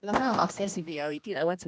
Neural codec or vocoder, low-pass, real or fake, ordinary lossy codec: codec, 16 kHz, 1 kbps, X-Codec, HuBERT features, trained on general audio; none; fake; none